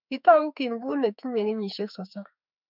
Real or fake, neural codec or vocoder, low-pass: fake; autoencoder, 48 kHz, 32 numbers a frame, DAC-VAE, trained on Japanese speech; 5.4 kHz